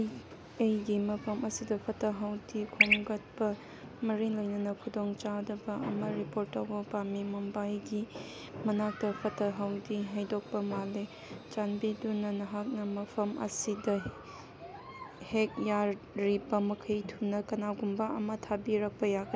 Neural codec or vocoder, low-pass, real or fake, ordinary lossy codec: none; none; real; none